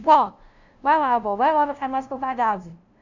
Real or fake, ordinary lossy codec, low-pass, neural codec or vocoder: fake; none; 7.2 kHz; codec, 16 kHz, 0.5 kbps, FunCodec, trained on LibriTTS, 25 frames a second